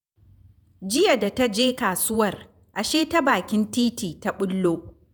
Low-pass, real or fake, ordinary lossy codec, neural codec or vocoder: none; fake; none; vocoder, 48 kHz, 128 mel bands, Vocos